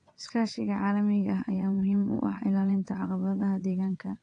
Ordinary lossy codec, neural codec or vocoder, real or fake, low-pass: AAC, 48 kbps; vocoder, 22.05 kHz, 80 mel bands, WaveNeXt; fake; 9.9 kHz